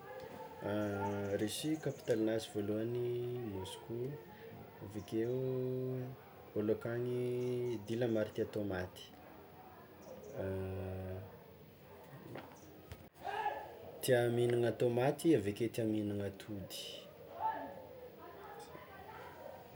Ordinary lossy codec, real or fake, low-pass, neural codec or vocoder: none; real; none; none